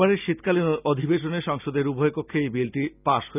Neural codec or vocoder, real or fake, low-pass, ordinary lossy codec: none; real; 3.6 kHz; none